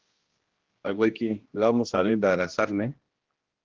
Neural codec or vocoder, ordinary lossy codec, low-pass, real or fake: codec, 16 kHz, 1 kbps, X-Codec, HuBERT features, trained on general audio; Opus, 16 kbps; 7.2 kHz; fake